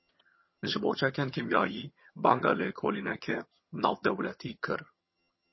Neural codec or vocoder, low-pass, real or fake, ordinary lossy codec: vocoder, 22.05 kHz, 80 mel bands, HiFi-GAN; 7.2 kHz; fake; MP3, 24 kbps